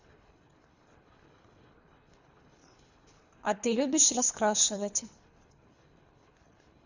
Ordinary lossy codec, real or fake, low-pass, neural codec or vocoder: none; fake; 7.2 kHz; codec, 24 kHz, 3 kbps, HILCodec